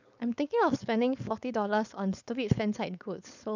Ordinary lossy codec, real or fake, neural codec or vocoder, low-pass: none; fake; codec, 16 kHz, 4.8 kbps, FACodec; 7.2 kHz